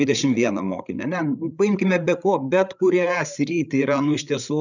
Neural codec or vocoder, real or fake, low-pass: codec, 16 kHz, 8 kbps, FreqCodec, larger model; fake; 7.2 kHz